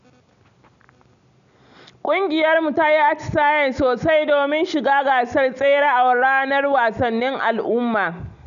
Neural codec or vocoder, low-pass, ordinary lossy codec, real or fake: none; 7.2 kHz; none; real